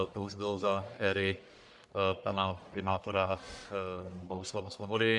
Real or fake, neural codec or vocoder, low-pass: fake; codec, 44.1 kHz, 1.7 kbps, Pupu-Codec; 10.8 kHz